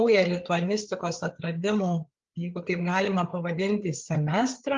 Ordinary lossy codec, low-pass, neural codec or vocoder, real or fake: Opus, 32 kbps; 7.2 kHz; codec, 16 kHz, 4 kbps, FreqCodec, larger model; fake